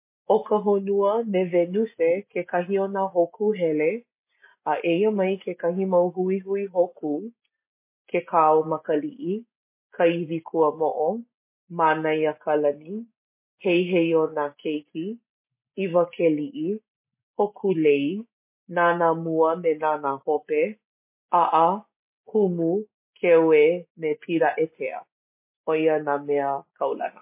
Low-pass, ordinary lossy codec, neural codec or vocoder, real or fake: 3.6 kHz; MP3, 24 kbps; none; real